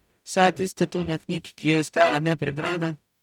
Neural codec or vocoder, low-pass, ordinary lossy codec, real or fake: codec, 44.1 kHz, 0.9 kbps, DAC; 19.8 kHz; none; fake